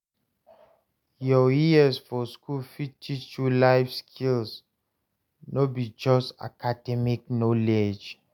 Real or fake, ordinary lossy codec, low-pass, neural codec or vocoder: real; none; 19.8 kHz; none